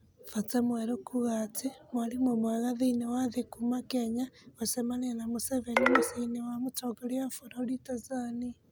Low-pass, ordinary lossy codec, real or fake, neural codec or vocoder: none; none; real; none